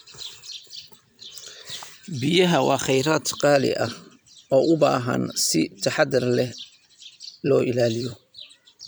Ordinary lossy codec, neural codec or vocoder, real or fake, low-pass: none; vocoder, 44.1 kHz, 128 mel bands every 256 samples, BigVGAN v2; fake; none